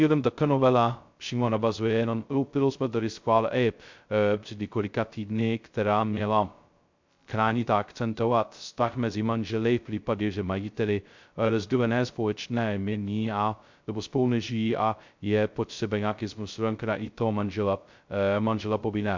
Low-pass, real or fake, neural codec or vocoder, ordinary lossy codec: 7.2 kHz; fake; codec, 16 kHz, 0.2 kbps, FocalCodec; MP3, 64 kbps